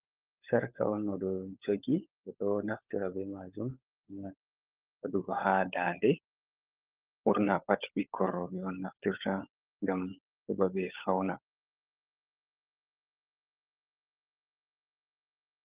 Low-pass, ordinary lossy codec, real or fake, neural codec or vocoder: 3.6 kHz; Opus, 32 kbps; fake; codec, 16 kHz, 16 kbps, FunCodec, trained on LibriTTS, 50 frames a second